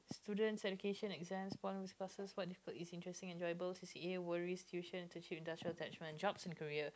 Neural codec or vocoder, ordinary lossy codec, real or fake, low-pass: none; none; real; none